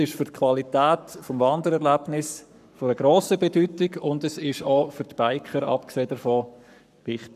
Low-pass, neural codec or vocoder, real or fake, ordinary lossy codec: 14.4 kHz; codec, 44.1 kHz, 7.8 kbps, Pupu-Codec; fake; none